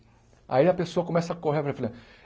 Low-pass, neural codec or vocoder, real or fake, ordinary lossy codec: none; none; real; none